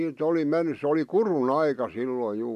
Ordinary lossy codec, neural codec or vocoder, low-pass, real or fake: MP3, 96 kbps; none; 14.4 kHz; real